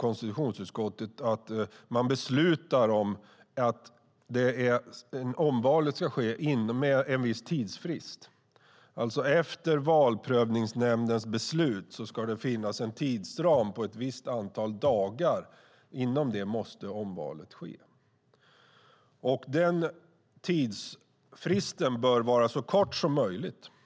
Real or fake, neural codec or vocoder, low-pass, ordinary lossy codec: real; none; none; none